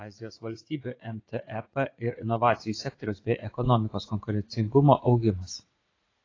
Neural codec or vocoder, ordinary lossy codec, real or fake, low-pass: vocoder, 44.1 kHz, 80 mel bands, Vocos; AAC, 32 kbps; fake; 7.2 kHz